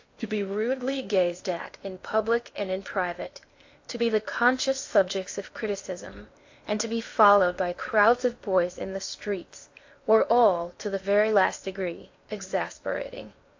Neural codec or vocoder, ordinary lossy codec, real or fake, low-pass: codec, 16 kHz in and 24 kHz out, 0.8 kbps, FocalCodec, streaming, 65536 codes; AAC, 48 kbps; fake; 7.2 kHz